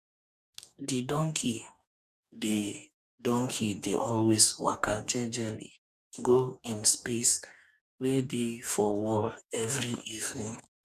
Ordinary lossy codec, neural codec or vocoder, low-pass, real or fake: none; codec, 44.1 kHz, 2.6 kbps, DAC; 14.4 kHz; fake